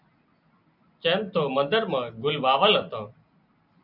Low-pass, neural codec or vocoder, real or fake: 5.4 kHz; none; real